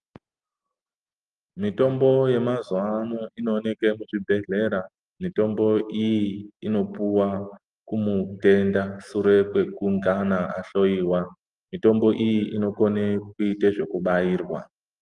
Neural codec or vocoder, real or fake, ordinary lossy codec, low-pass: none; real; Opus, 32 kbps; 10.8 kHz